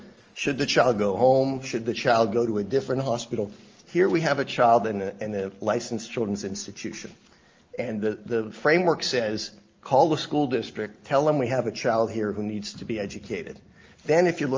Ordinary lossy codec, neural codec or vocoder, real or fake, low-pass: Opus, 24 kbps; none; real; 7.2 kHz